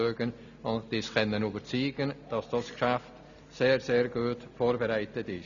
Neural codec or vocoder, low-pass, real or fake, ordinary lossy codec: none; 7.2 kHz; real; none